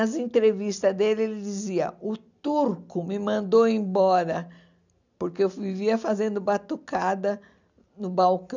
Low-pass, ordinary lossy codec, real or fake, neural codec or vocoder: 7.2 kHz; none; real; none